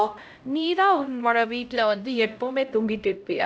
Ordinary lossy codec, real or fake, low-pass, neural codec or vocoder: none; fake; none; codec, 16 kHz, 0.5 kbps, X-Codec, HuBERT features, trained on LibriSpeech